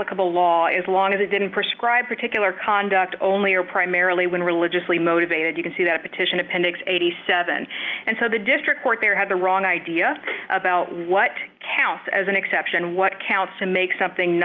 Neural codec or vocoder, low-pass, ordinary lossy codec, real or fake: none; 7.2 kHz; Opus, 24 kbps; real